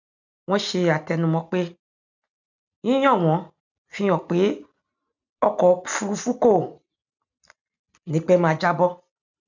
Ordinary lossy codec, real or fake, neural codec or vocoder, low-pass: none; real; none; 7.2 kHz